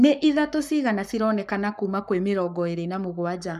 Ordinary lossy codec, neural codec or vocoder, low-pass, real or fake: none; codec, 44.1 kHz, 7.8 kbps, Pupu-Codec; 14.4 kHz; fake